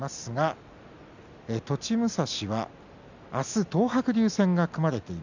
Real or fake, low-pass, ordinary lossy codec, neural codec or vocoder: real; 7.2 kHz; none; none